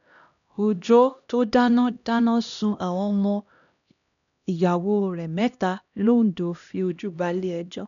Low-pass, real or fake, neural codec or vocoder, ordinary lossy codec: 7.2 kHz; fake; codec, 16 kHz, 1 kbps, X-Codec, HuBERT features, trained on LibriSpeech; none